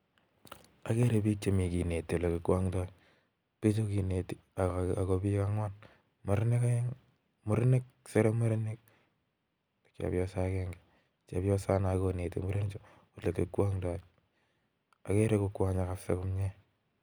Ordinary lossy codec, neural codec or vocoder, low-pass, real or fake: none; none; none; real